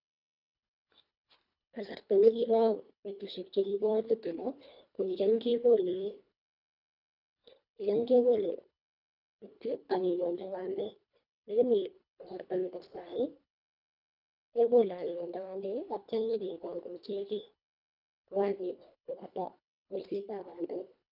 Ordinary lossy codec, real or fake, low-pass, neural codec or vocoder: none; fake; 5.4 kHz; codec, 24 kHz, 1.5 kbps, HILCodec